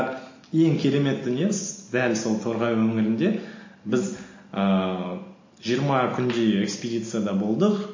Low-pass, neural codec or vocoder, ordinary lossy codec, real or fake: 7.2 kHz; none; MP3, 32 kbps; real